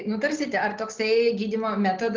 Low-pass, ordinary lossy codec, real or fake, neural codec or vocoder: 7.2 kHz; Opus, 16 kbps; real; none